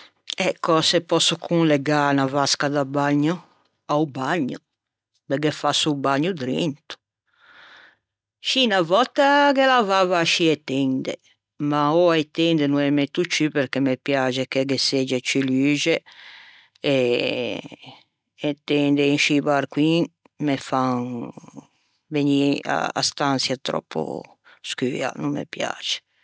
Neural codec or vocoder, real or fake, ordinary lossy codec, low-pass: none; real; none; none